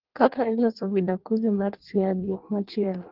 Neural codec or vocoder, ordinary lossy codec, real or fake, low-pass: codec, 16 kHz in and 24 kHz out, 1.1 kbps, FireRedTTS-2 codec; Opus, 16 kbps; fake; 5.4 kHz